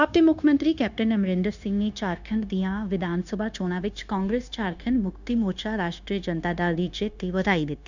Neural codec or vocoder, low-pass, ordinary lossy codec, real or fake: codec, 16 kHz, 0.9 kbps, LongCat-Audio-Codec; 7.2 kHz; none; fake